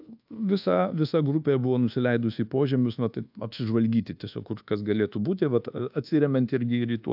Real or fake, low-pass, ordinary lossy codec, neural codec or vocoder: fake; 5.4 kHz; AAC, 48 kbps; codec, 24 kHz, 1.2 kbps, DualCodec